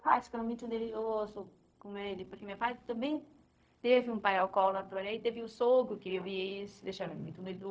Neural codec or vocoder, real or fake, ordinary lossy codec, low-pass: codec, 16 kHz, 0.4 kbps, LongCat-Audio-Codec; fake; none; none